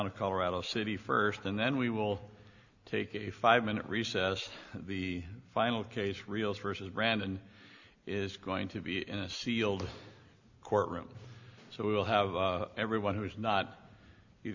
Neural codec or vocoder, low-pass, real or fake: none; 7.2 kHz; real